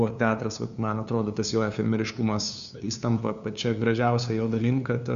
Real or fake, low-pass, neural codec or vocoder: fake; 7.2 kHz; codec, 16 kHz, 2 kbps, FunCodec, trained on LibriTTS, 25 frames a second